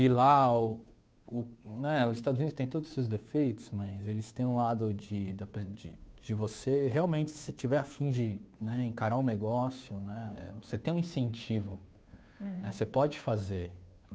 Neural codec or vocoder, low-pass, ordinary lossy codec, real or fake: codec, 16 kHz, 2 kbps, FunCodec, trained on Chinese and English, 25 frames a second; none; none; fake